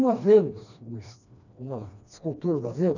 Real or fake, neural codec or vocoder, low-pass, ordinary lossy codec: fake; codec, 16 kHz, 2 kbps, FreqCodec, smaller model; 7.2 kHz; none